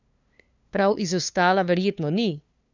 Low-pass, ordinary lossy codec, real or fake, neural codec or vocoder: 7.2 kHz; none; fake; codec, 16 kHz, 2 kbps, FunCodec, trained on LibriTTS, 25 frames a second